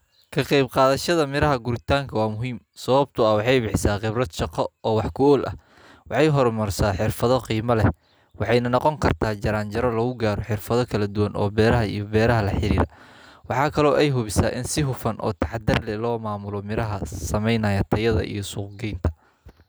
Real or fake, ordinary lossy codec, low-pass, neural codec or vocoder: real; none; none; none